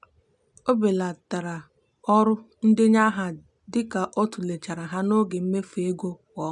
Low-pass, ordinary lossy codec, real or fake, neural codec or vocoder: 10.8 kHz; none; real; none